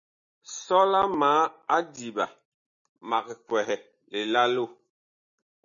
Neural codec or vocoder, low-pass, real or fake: none; 7.2 kHz; real